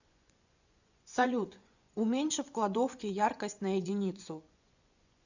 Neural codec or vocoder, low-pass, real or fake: vocoder, 24 kHz, 100 mel bands, Vocos; 7.2 kHz; fake